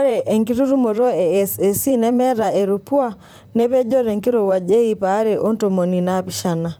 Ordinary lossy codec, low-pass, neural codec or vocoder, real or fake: none; none; vocoder, 44.1 kHz, 128 mel bands, Pupu-Vocoder; fake